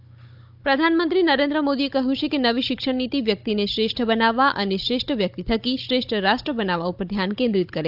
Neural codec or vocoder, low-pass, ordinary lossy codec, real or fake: codec, 16 kHz, 16 kbps, FunCodec, trained on Chinese and English, 50 frames a second; 5.4 kHz; AAC, 48 kbps; fake